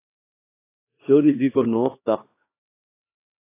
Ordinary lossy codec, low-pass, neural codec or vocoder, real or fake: AAC, 24 kbps; 3.6 kHz; codec, 16 kHz, 2 kbps, X-Codec, HuBERT features, trained on LibriSpeech; fake